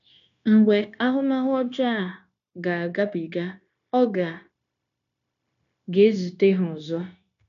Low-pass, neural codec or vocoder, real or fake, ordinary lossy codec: 7.2 kHz; codec, 16 kHz, 0.9 kbps, LongCat-Audio-Codec; fake; none